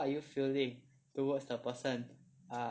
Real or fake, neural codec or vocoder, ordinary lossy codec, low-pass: real; none; none; none